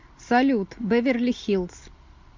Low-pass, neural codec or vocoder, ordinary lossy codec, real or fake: 7.2 kHz; none; MP3, 64 kbps; real